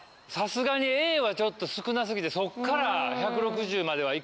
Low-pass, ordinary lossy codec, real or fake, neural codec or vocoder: none; none; real; none